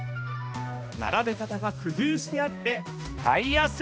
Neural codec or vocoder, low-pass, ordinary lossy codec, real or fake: codec, 16 kHz, 1 kbps, X-Codec, HuBERT features, trained on balanced general audio; none; none; fake